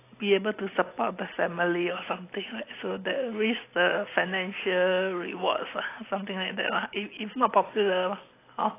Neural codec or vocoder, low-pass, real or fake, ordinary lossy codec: none; 3.6 kHz; real; AAC, 24 kbps